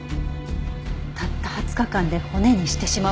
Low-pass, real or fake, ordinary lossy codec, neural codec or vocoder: none; real; none; none